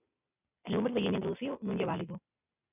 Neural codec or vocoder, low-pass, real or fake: none; 3.6 kHz; real